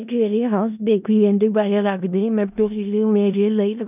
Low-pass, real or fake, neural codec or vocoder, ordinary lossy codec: 3.6 kHz; fake; codec, 16 kHz in and 24 kHz out, 0.4 kbps, LongCat-Audio-Codec, four codebook decoder; none